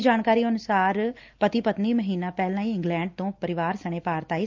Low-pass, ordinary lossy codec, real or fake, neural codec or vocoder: 7.2 kHz; Opus, 24 kbps; real; none